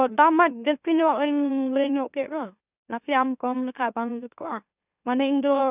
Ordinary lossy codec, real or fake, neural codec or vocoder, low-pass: none; fake; autoencoder, 44.1 kHz, a latent of 192 numbers a frame, MeloTTS; 3.6 kHz